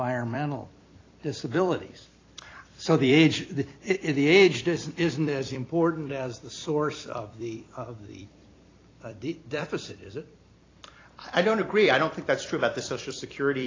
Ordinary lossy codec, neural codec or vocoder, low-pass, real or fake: AAC, 32 kbps; vocoder, 44.1 kHz, 128 mel bands every 256 samples, BigVGAN v2; 7.2 kHz; fake